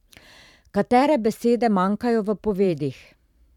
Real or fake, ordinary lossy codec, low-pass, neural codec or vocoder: fake; none; 19.8 kHz; vocoder, 44.1 kHz, 128 mel bands every 512 samples, BigVGAN v2